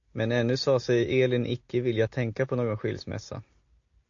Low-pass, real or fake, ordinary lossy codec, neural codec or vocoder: 7.2 kHz; real; AAC, 48 kbps; none